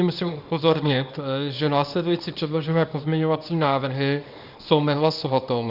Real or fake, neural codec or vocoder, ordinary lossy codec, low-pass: fake; codec, 24 kHz, 0.9 kbps, WavTokenizer, small release; Opus, 64 kbps; 5.4 kHz